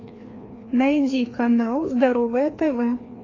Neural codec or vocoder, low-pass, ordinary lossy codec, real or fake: codec, 16 kHz, 2 kbps, FreqCodec, larger model; 7.2 kHz; AAC, 32 kbps; fake